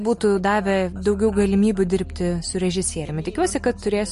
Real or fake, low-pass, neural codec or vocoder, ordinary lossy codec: real; 14.4 kHz; none; MP3, 48 kbps